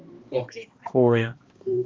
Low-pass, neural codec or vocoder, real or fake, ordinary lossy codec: 7.2 kHz; codec, 16 kHz, 1 kbps, X-Codec, HuBERT features, trained on balanced general audio; fake; Opus, 32 kbps